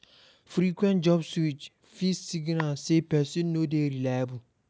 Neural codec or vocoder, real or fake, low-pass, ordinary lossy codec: none; real; none; none